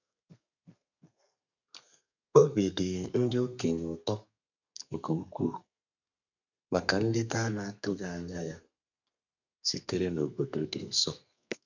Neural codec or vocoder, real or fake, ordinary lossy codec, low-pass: codec, 32 kHz, 1.9 kbps, SNAC; fake; none; 7.2 kHz